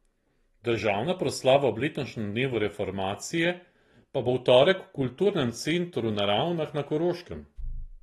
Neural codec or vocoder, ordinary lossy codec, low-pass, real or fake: none; AAC, 32 kbps; 19.8 kHz; real